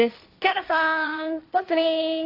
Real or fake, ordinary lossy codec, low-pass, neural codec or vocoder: fake; AAC, 32 kbps; 5.4 kHz; codec, 16 kHz, 1.1 kbps, Voila-Tokenizer